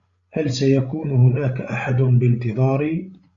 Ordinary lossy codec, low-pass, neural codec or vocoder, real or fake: Opus, 64 kbps; 7.2 kHz; codec, 16 kHz, 16 kbps, FreqCodec, larger model; fake